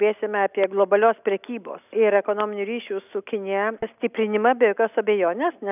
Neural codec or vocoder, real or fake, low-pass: none; real; 3.6 kHz